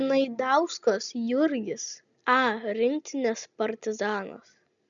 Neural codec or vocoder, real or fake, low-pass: none; real; 7.2 kHz